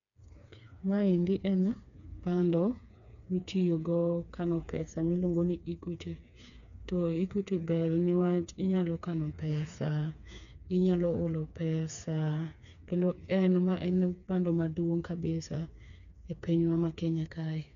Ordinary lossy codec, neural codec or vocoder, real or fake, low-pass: none; codec, 16 kHz, 4 kbps, FreqCodec, smaller model; fake; 7.2 kHz